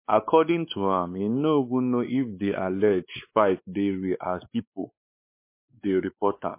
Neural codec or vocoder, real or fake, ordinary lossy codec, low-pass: codec, 16 kHz, 4 kbps, X-Codec, WavLM features, trained on Multilingual LibriSpeech; fake; MP3, 24 kbps; 3.6 kHz